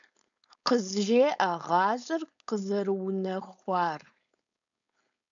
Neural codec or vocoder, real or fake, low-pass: codec, 16 kHz, 4.8 kbps, FACodec; fake; 7.2 kHz